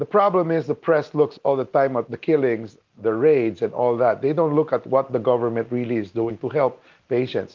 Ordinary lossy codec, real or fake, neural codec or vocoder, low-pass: Opus, 32 kbps; real; none; 7.2 kHz